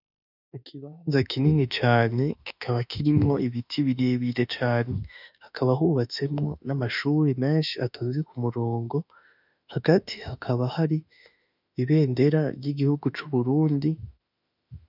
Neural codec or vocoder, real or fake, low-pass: autoencoder, 48 kHz, 32 numbers a frame, DAC-VAE, trained on Japanese speech; fake; 5.4 kHz